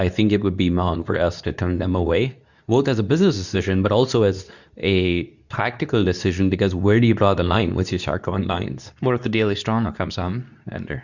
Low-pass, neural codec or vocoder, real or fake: 7.2 kHz; codec, 24 kHz, 0.9 kbps, WavTokenizer, medium speech release version 2; fake